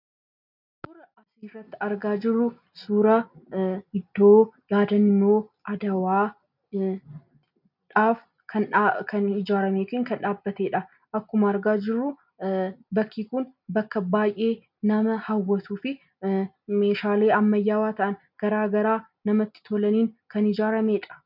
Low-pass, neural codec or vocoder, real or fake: 5.4 kHz; none; real